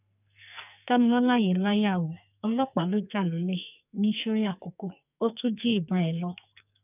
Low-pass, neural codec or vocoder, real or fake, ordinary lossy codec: 3.6 kHz; codec, 44.1 kHz, 2.6 kbps, SNAC; fake; none